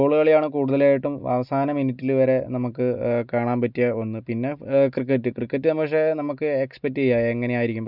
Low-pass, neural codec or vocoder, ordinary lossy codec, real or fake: 5.4 kHz; none; none; real